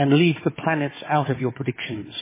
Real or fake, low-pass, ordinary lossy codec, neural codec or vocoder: fake; 3.6 kHz; MP3, 16 kbps; codec, 16 kHz, 4 kbps, FunCodec, trained on Chinese and English, 50 frames a second